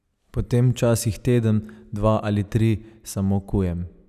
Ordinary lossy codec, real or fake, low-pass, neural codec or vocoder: none; real; 14.4 kHz; none